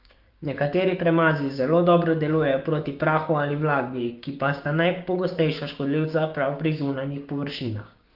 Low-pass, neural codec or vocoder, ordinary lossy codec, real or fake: 5.4 kHz; codec, 16 kHz, 6 kbps, DAC; Opus, 24 kbps; fake